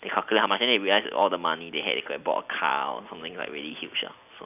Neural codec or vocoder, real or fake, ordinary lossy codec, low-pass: none; real; none; 3.6 kHz